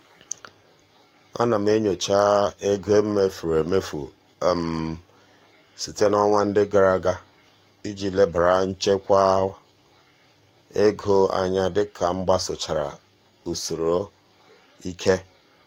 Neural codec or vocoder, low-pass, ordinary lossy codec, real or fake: codec, 44.1 kHz, 7.8 kbps, DAC; 19.8 kHz; AAC, 48 kbps; fake